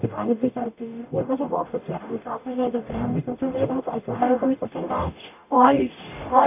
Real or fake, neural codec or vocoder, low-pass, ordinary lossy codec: fake; codec, 44.1 kHz, 0.9 kbps, DAC; 3.6 kHz; none